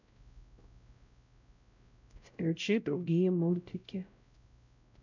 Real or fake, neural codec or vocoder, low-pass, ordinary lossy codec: fake; codec, 16 kHz, 0.5 kbps, X-Codec, WavLM features, trained on Multilingual LibriSpeech; 7.2 kHz; none